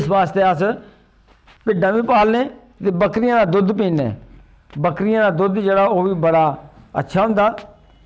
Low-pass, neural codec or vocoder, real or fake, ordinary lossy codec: none; none; real; none